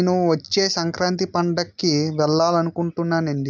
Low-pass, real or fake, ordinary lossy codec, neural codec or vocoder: none; real; none; none